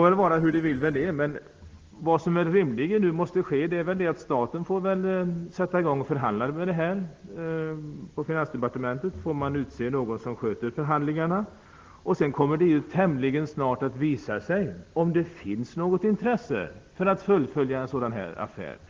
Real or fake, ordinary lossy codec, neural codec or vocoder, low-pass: real; Opus, 16 kbps; none; 7.2 kHz